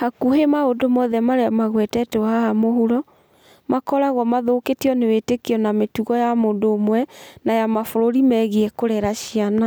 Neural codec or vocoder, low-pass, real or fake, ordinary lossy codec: none; none; real; none